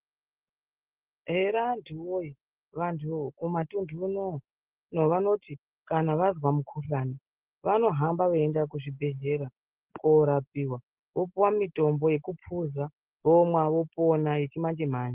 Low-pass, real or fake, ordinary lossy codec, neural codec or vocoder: 3.6 kHz; real; Opus, 16 kbps; none